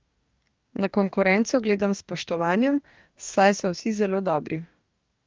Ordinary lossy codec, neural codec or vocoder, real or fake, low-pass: Opus, 24 kbps; codec, 44.1 kHz, 2.6 kbps, DAC; fake; 7.2 kHz